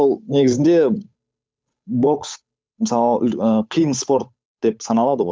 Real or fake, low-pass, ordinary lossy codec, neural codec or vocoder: fake; none; none; codec, 16 kHz, 8 kbps, FunCodec, trained on Chinese and English, 25 frames a second